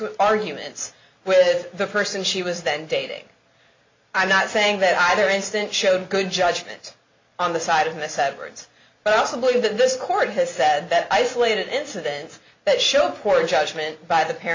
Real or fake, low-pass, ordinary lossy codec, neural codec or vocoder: real; 7.2 kHz; MP3, 48 kbps; none